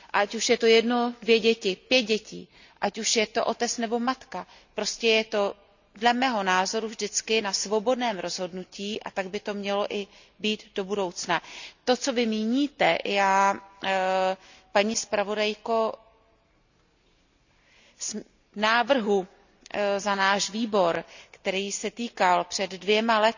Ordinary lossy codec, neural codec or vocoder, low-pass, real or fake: none; none; 7.2 kHz; real